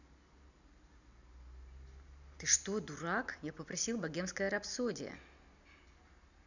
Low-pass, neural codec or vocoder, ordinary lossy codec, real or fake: 7.2 kHz; none; none; real